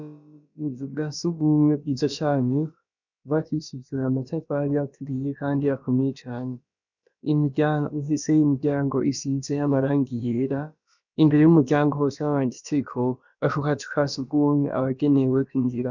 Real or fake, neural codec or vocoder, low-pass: fake; codec, 16 kHz, about 1 kbps, DyCAST, with the encoder's durations; 7.2 kHz